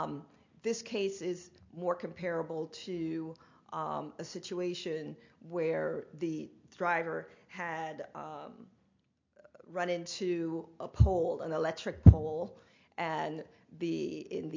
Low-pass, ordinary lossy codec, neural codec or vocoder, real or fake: 7.2 kHz; MP3, 48 kbps; none; real